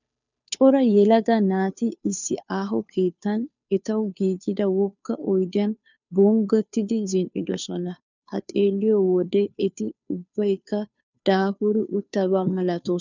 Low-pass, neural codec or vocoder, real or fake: 7.2 kHz; codec, 16 kHz, 2 kbps, FunCodec, trained on Chinese and English, 25 frames a second; fake